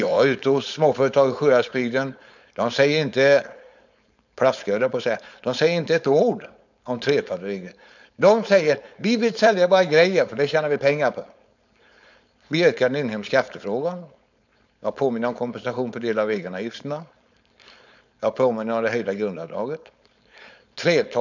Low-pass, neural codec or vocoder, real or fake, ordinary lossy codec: 7.2 kHz; codec, 16 kHz, 4.8 kbps, FACodec; fake; none